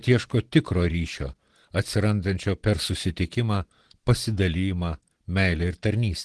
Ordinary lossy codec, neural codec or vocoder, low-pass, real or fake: Opus, 16 kbps; none; 10.8 kHz; real